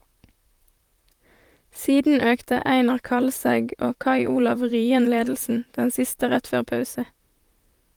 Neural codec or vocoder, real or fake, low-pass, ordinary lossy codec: vocoder, 44.1 kHz, 128 mel bands, Pupu-Vocoder; fake; 19.8 kHz; Opus, 32 kbps